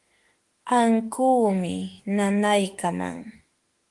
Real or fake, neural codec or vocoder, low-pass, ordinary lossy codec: fake; autoencoder, 48 kHz, 32 numbers a frame, DAC-VAE, trained on Japanese speech; 10.8 kHz; Opus, 24 kbps